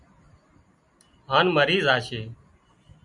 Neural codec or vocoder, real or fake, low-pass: none; real; 10.8 kHz